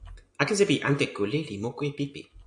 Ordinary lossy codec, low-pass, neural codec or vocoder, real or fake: AAC, 64 kbps; 10.8 kHz; none; real